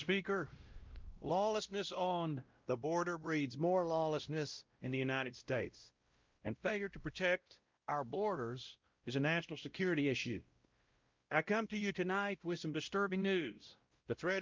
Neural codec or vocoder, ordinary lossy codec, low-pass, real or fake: codec, 16 kHz, 0.5 kbps, X-Codec, WavLM features, trained on Multilingual LibriSpeech; Opus, 24 kbps; 7.2 kHz; fake